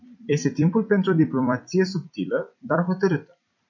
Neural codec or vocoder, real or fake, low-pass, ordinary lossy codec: vocoder, 44.1 kHz, 128 mel bands every 256 samples, BigVGAN v2; fake; 7.2 kHz; MP3, 64 kbps